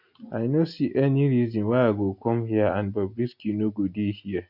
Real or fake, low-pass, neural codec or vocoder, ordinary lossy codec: real; 5.4 kHz; none; none